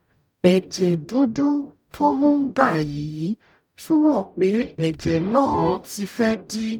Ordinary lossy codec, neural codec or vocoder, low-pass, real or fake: none; codec, 44.1 kHz, 0.9 kbps, DAC; 19.8 kHz; fake